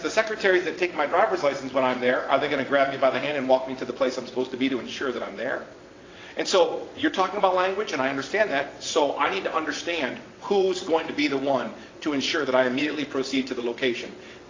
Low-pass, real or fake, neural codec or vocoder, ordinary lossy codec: 7.2 kHz; fake; vocoder, 22.05 kHz, 80 mel bands, WaveNeXt; AAC, 32 kbps